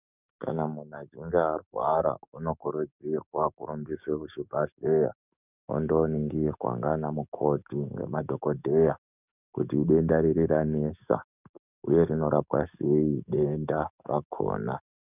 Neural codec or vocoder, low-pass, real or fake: codec, 44.1 kHz, 7.8 kbps, DAC; 3.6 kHz; fake